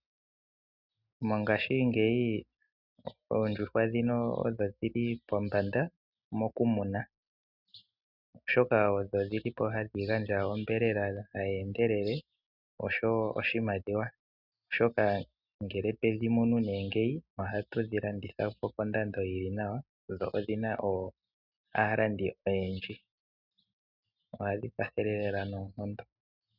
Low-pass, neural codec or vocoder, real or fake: 5.4 kHz; none; real